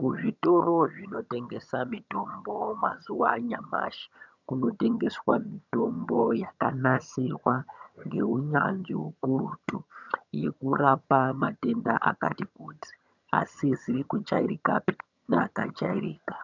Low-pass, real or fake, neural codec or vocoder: 7.2 kHz; fake; vocoder, 22.05 kHz, 80 mel bands, HiFi-GAN